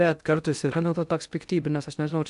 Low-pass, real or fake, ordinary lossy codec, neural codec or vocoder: 10.8 kHz; fake; AAC, 96 kbps; codec, 16 kHz in and 24 kHz out, 0.6 kbps, FocalCodec, streaming, 2048 codes